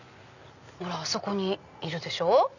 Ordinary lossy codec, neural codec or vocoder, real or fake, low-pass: none; none; real; 7.2 kHz